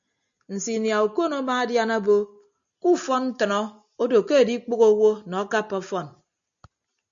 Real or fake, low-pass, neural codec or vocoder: real; 7.2 kHz; none